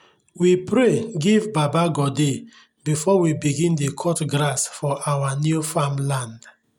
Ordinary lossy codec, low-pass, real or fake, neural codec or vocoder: none; 19.8 kHz; real; none